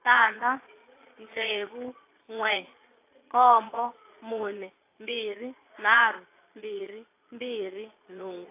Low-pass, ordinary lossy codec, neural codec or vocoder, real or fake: 3.6 kHz; AAC, 24 kbps; vocoder, 22.05 kHz, 80 mel bands, Vocos; fake